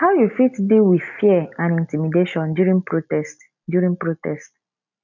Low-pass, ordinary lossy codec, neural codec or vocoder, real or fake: 7.2 kHz; none; none; real